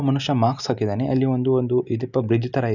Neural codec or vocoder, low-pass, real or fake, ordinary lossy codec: none; 7.2 kHz; real; none